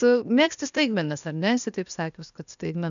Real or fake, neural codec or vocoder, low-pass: fake; codec, 16 kHz, 0.8 kbps, ZipCodec; 7.2 kHz